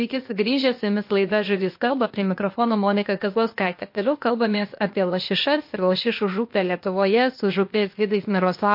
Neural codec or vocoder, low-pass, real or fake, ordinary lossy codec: codec, 16 kHz, 0.8 kbps, ZipCodec; 5.4 kHz; fake; MP3, 32 kbps